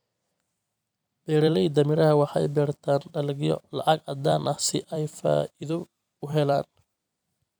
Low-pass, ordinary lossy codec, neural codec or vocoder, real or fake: none; none; vocoder, 44.1 kHz, 128 mel bands every 256 samples, BigVGAN v2; fake